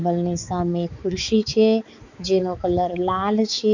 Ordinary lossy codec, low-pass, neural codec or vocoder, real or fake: none; 7.2 kHz; codec, 16 kHz, 4 kbps, X-Codec, HuBERT features, trained on balanced general audio; fake